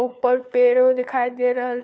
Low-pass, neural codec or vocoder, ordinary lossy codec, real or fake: none; codec, 16 kHz, 4 kbps, FunCodec, trained on LibriTTS, 50 frames a second; none; fake